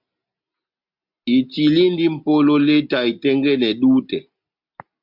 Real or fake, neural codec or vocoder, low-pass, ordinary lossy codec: real; none; 5.4 kHz; MP3, 48 kbps